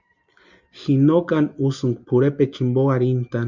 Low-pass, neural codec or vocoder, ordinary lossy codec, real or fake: 7.2 kHz; none; Opus, 64 kbps; real